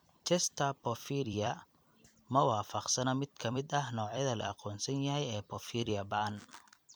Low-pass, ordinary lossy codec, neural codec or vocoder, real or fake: none; none; vocoder, 44.1 kHz, 128 mel bands every 256 samples, BigVGAN v2; fake